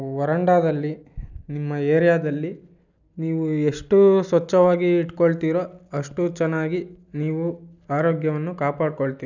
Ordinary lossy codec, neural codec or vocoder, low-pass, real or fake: none; none; 7.2 kHz; real